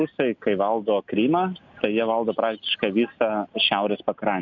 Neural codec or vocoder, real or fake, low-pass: none; real; 7.2 kHz